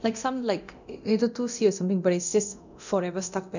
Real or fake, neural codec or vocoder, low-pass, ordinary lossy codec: fake; codec, 24 kHz, 0.9 kbps, DualCodec; 7.2 kHz; none